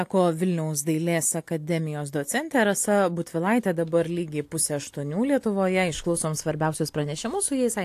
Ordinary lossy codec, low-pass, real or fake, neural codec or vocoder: AAC, 64 kbps; 14.4 kHz; real; none